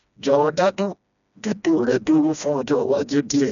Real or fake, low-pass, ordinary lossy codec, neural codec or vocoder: fake; 7.2 kHz; none; codec, 16 kHz, 1 kbps, FreqCodec, smaller model